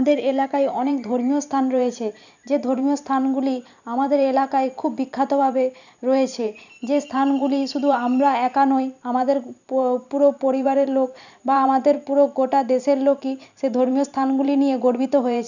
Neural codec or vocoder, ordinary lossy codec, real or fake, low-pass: vocoder, 44.1 kHz, 128 mel bands every 512 samples, BigVGAN v2; none; fake; 7.2 kHz